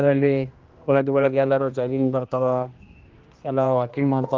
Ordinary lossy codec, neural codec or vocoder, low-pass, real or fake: Opus, 32 kbps; codec, 16 kHz, 1 kbps, X-Codec, HuBERT features, trained on general audio; 7.2 kHz; fake